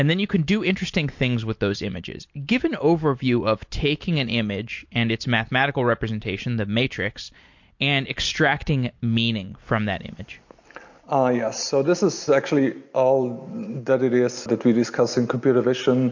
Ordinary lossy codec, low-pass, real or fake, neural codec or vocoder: MP3, 48 kbps; 7.2 kHz; real; none